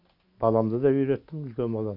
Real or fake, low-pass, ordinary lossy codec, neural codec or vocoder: real; 5.4 kHz; none; none